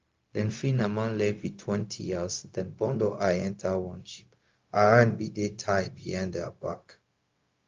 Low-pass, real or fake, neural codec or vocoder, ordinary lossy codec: 7.2 kHz; fake; codec, 16 kHz, 0.4 kbps, LongCat-Audio-Codec; Opus, 32 kbps